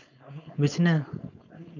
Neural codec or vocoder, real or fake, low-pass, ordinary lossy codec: codec, 16 kHz, 4.8 kbps, FACodec; fake; 7.2 kHz; none